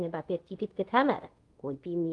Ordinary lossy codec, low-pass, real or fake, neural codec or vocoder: Opus, 24 kbps; 10.8 kHz; fake; codec, 24 kHz, 0.5 kbps, DualCodec